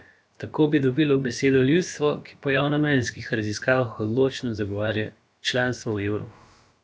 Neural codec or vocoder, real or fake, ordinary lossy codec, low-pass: codec, 16 kHz, about 1 kbps, DyCAST, with the encoder's durations; fake; none; none